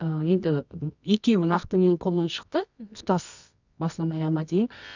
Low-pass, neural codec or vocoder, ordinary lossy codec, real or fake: 7.2 kHz; codec, 24 kHz, 0.9 kbps, WavTokenizer, medium music audio release; none; fake